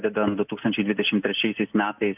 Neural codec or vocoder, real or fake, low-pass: none; real; 3.6 kHz